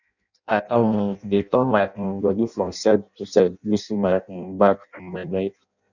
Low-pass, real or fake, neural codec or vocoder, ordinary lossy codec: 7.2 kHz; fake; codec, 16 kHz in and 24 kHz out, 0.6 kbps, FireRedTTS-2 codec; none